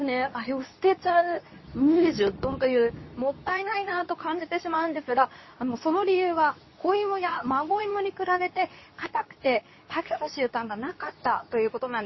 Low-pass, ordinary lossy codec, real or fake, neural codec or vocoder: 7.2 kHz; MP3, 24 kbps; fake; codec, 24 kHz, 0.9 kbps, WavTokenizer, medium speech release version 2